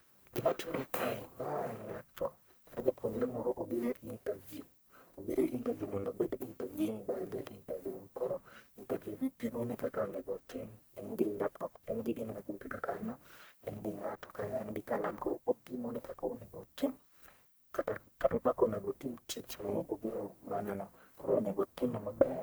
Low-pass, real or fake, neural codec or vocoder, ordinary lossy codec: none; fake; codec, 44.1 kHz, 1.7 kbps, Pupu-Codec; none